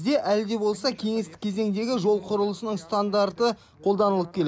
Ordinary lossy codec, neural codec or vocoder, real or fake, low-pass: none; none; real; none